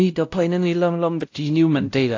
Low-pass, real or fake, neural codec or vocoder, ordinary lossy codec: 7.2 kHz; fake; codec, 16 kHz, 0.5 kbps, X-Codec, WavLM features, trained on Multilingual LibriSpeech; AAC, 48 kbps